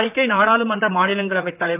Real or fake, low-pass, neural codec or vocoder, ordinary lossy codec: fake; 3.6 kHz; vocoder, 44.1 kHz, 128 mel bands, Pupu-Vocoder; AAC, 16 kbps